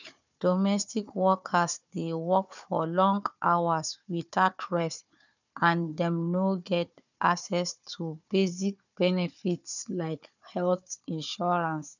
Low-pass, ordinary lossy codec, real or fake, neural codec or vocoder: 7.2 kHz; none; fake; codec, 16 kHz, 4 kbps, FunCodec, trained on Chinese and English, 50 frames a second